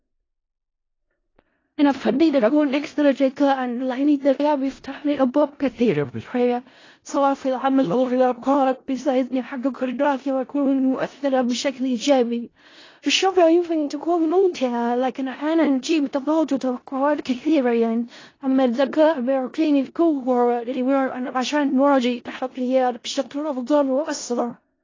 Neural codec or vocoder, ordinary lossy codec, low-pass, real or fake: codec, 16 kHz in and 24 kHz out, 0.4 kbps, LongCat-Audio-Codec, four codebook decoder; AAC, 32 kbps; 7.2 kHz; fake